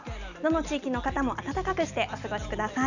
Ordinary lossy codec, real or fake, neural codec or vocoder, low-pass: none; real; none; 7.2 kHz